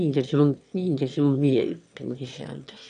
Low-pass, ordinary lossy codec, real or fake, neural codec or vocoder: 9.9 kHz; MP3, 96 kbps; fake; autoencoder, 22.05 kHz, a latent of 192 numbers a frame, VITS, trained on one speaker